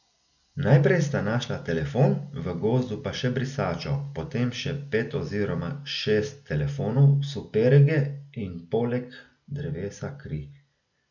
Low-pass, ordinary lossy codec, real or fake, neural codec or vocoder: 7.2 kHz; none; real; none